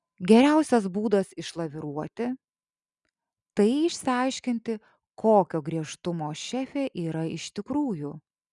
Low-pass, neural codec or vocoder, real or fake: 10.8 kHz; none; real